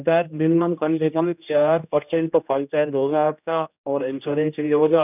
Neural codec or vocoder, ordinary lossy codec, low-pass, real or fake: codec, 16 kHz, 1 kbps, X-Codec, HuBERT features, trained on general audio; none; 3.6 kHz; fake